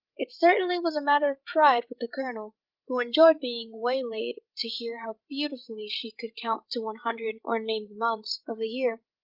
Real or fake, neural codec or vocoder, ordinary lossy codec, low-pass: fake; codec, 16 kHz, 16 kbps, FreqCodec, larger model; Opus, 24 kbps; 5.4 kHz